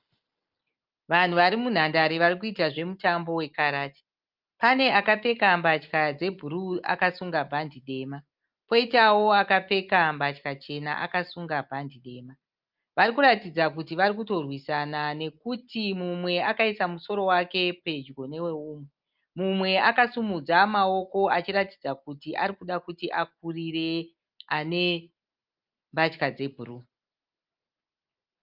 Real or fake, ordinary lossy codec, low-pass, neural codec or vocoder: real; Opus, 24 kbps; 5.4 kHz; none